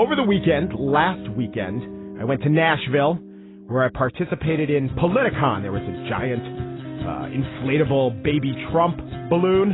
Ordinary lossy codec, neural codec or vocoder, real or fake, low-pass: AAC, 16 kbps; none; real; 7.2 kHz